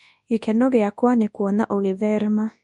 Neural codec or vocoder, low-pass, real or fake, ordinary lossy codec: codec, 24 kHz, 0.9 kbps, WavTokenizer, large speech release; 10.8 kHz; fake; MP3, 64 kbps